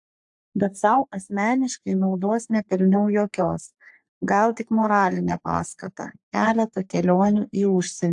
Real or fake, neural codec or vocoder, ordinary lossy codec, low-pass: fake; codec, 44.1 kHz, 3.4 kbps, Pupu-Codec; AAC, 64 kbps; 10.8 kHz